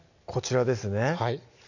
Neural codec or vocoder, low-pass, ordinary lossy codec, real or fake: none; 7.2 kHz; none; real